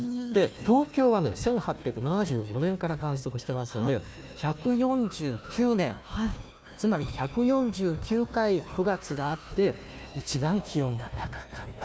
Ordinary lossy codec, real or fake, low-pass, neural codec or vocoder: none; fake; none; codec, 16 kHz, 1 kbps, FunCodec, trained on Chinese and English, 50 frames a second